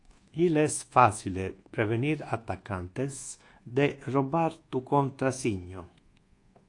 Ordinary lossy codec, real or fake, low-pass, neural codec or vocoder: AAC, 48 kbps; fake; 10.8 kHz; codec, 24 kHz, 1.2 kbps, DualCodec